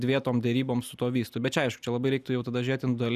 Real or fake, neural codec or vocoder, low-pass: real; none; 14.4 kHz